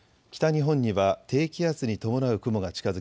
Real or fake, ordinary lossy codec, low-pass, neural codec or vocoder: real; none; none; none